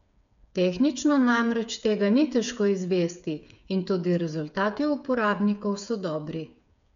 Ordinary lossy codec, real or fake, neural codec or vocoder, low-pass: none; fake; codec, 16 kHz, 8 kbps, FreqCodec, smaller model; 7.2 kHz